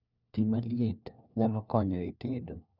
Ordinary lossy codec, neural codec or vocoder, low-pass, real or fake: none; codec, 16 kHz, 1 kbps, FunCodec, trained on LibriTTS, 50 frames a second; 5.4 kHz; fake